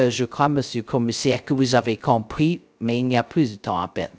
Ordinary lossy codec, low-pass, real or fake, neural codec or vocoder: none; none; fake; codec, 16 kHz, 0.3 kbps, FocalCodec